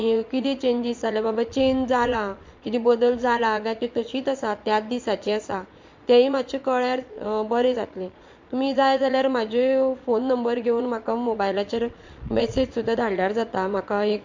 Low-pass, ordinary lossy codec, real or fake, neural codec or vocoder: 7.2 kHz; MP3, 48 kbps; fake; vocoder, 22.05 kHz, 80 mel bands, Vocos